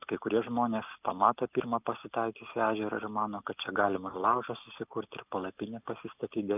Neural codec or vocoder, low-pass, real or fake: none; 3.6 kHz; real